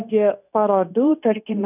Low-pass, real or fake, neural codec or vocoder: 3.6 kHz; fake; codec, 16 kHz, 0.9 kbps, LongCat-Audio-Codec